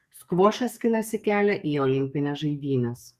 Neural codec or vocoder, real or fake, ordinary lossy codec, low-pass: codec, 44.1 kHz, 2.6 kbps, SNAC; fake; Opus, 64 kbps; 14.4 kHz